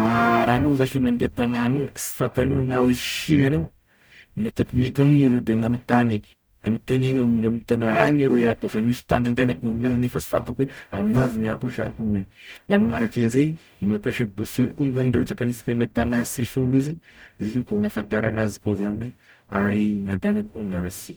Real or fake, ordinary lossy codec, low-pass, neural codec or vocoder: fake; none; none; codec, 44.1 kHz, 0.9 kbps, DAC